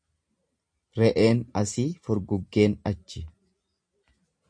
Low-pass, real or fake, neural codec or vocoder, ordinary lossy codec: 9.9 kHz; real; none; MP3, 48 kbps